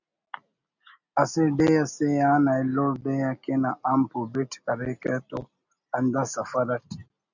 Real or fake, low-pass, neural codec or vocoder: real; 7.2 kHz; none